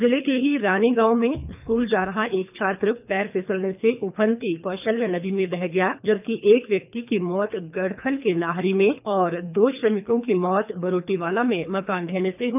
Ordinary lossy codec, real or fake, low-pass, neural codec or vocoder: none; fake; 3.6 kHz; codec, 24 kHz, 3 kbps, HILCodec